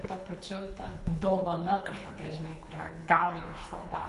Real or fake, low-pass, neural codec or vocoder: fake; 10.8 kHz; codec, 24 kHz, 3 kbps, HILCodec